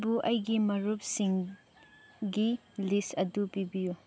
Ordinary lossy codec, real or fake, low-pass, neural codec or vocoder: none; real; none; none